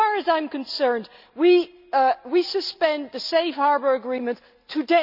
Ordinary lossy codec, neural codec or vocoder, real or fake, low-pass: none; none; real; 5.4 kHz